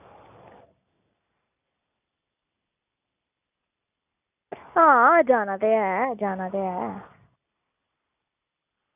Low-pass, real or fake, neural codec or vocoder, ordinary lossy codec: 3.6 kHz; real; none; none